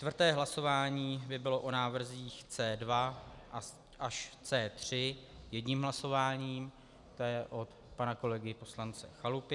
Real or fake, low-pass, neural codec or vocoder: real; 10.8 kHz; none